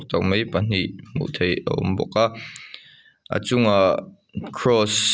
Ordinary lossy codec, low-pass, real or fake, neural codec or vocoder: none; none; real; none